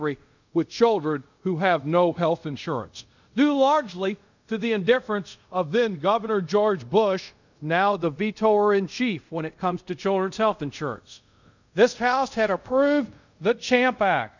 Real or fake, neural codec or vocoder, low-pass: fake; codec, 24 kHz, 0.5 kbps, DualCodec; 7.2 kHz